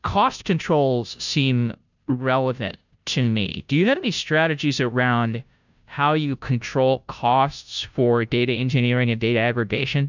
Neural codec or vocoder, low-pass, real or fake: codec, 16 kHz, 0.5 kbps, FunCodec, trained on Chinese and English, 25 frames a second; 7.2 kHz; fake